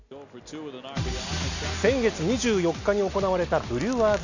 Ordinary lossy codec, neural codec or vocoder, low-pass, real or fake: none; none; 7.2 kHz; real